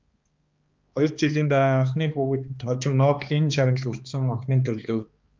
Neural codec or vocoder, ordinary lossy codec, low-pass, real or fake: codec, 16 kHz, 2 kbps, X-Codec, HuBERT features, trained on balanced general audio; Opus, 24 kbps; 7.2 kHz; fake